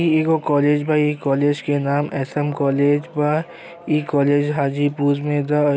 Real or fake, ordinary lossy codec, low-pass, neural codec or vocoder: real; none; none; none